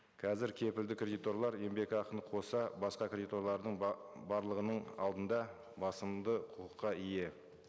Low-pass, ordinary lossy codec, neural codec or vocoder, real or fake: none; none; none; real